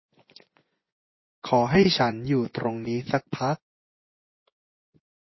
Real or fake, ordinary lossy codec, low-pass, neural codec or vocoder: fake; MP3, 24 kbps; 7.2 kHz; vocoder, 24 kHz, 100 mel bands, Vocos